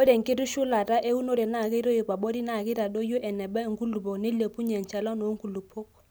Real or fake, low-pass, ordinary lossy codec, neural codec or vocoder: real; none; none; none